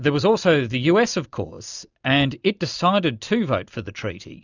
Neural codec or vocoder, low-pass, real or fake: none; 7.2 kHz; real